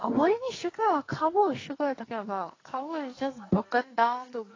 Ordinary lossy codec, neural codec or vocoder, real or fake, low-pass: AAC, 32 kbps; codec, 44.1 kHz, 2.6 kbps, SNAC; fake; 7.2 kHz